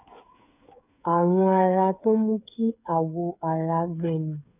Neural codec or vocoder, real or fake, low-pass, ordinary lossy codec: codec, 16 kHz, 8 kbps, FreqCodec, smaller model; fake; 3.6 kHz; none